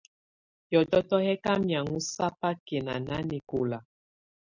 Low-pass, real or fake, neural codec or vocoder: 7.2 kHz; real; none